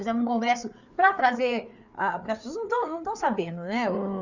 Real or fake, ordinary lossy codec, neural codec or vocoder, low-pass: fake; none; codec, 16 kHz, 4 kbps, FreqCodec, larger model; 7.2 kHz